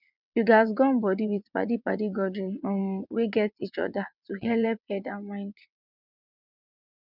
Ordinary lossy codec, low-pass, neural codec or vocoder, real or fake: none; 5.4 kHz; vocoder, 22.05 kHz, 80 mel bands, WaveNeXt; fake